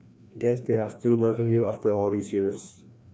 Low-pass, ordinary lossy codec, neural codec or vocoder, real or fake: none; none; codec, 16 kHz, 1 kbps, FreqCodec, larger model; fake